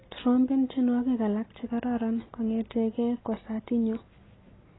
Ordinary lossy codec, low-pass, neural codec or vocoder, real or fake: AAC, 16 kbps; 7.2 kHz; none; real